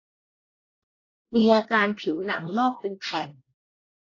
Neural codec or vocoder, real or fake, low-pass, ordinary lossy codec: codec, 24 kHz, 1 kbps, SNAC; fake; 7.2 kHz; AAC, 32 kbps